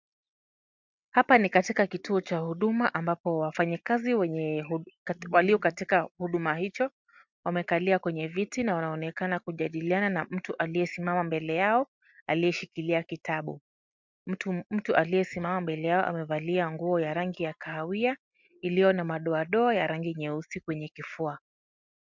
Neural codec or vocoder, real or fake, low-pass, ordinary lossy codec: none; real; 7.2 kHz; MP3, 64 kbps